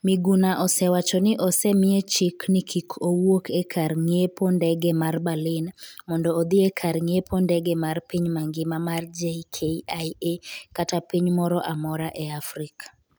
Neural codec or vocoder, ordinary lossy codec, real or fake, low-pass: none; none; real; none